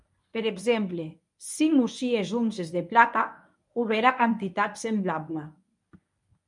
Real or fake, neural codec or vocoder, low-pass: fake; codec, 24 kHz, 0.9 kbps, WavTokenizer, medium speech release version 1; 10.8 kHz